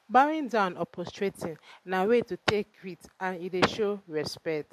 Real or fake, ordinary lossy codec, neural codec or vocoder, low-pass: real; MP3, 64 kbps; none; 14.4 kHz